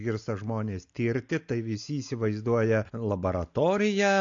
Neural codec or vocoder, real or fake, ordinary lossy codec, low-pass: none; real; AAC, 64 kbps; 7.2 kHz